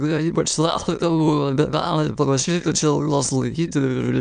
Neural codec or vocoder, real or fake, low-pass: autoencoder, 22.05 kHz, a latent of 192 numbers a frame, VITS, trained on many speakers; fake; 9.9 kHz